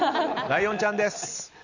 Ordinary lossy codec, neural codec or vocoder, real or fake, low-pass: none; none; real; 7.2 kHz